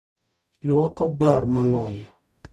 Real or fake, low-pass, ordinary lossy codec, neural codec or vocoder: fake; 14.4 kHz; none; codec, 44.1 kHz, 0.9 kbps, DAC